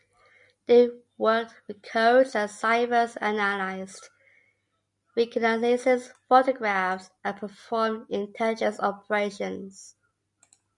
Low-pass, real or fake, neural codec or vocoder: 10.8 kHz; real; none